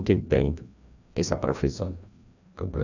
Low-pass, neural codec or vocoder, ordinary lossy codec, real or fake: 7.2 kHz; codec, 16 kHz, 1 kbps, FreqCodec, larger model; none; fake